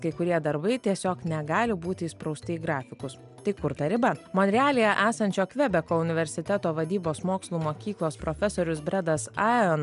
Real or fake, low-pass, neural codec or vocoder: real; 10.8 kHz; none